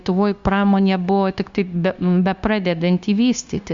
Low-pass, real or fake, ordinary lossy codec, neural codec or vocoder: 7.2 kHz; fake; Opus, 64 kbps; codec, 16 kHz, 0.9 kbps, LongCat-Audio-Codec